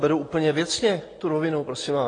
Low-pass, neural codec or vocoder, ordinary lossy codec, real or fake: 9.9 kHz; none; AAC, 32 kbps; real